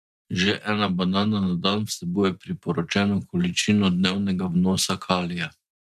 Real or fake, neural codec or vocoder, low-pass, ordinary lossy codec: real; none; 14.4 kHz; none